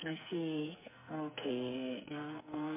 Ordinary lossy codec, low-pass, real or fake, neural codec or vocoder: MP3, 32 kbps; 3.6 kHz; fake; codec, 44.1 kHz, 2.6 kbps, SNAC